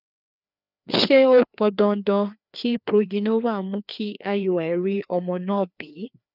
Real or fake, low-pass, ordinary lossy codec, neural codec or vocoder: fake; 5.4 kHz; none; codec, 16 kHz, 2 kbps, FreqCodec, larger model